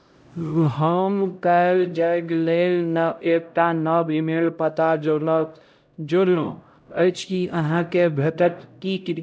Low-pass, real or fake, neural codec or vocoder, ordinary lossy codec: none; fake; codec, 16 kHz, 0.5 kbps, X-Codec, HuBERT features, trained on LibriSpeech; none